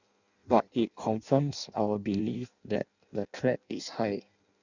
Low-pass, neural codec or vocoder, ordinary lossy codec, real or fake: 7.2 kHz; codec, 16 kHz in and 24 kHz out, 0.6 kbps, FireRedTTS-2 codec; none; fake